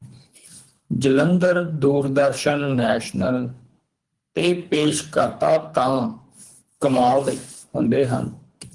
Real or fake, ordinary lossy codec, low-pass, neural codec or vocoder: fake; Opus, 24 kbps; 10.8 kHz; codec, 24 kHz, 3 kbps, HILCodec